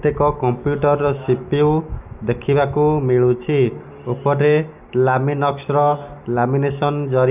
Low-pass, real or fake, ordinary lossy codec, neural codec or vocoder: 3.6 kHz; real; none; none